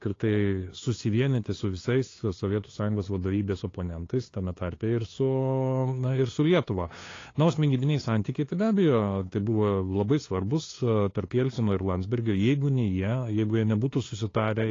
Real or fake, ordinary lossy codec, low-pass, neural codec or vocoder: fake; AAC, 32 kbps; 7.2 kHz; codec, 16 kHz, 2 kbps, FunCodec, trained on LibriTTS, 25 frames a second